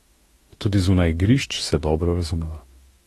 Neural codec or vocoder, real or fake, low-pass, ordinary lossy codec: autoencoder, 48 kHz, 32 numbers a frame, DAC-VAE, trained on Japanese speech; fake; 19.8 kHz; AAC, 32 kbps